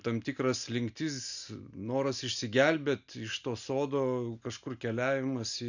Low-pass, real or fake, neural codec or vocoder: 7.2 kHz; real; none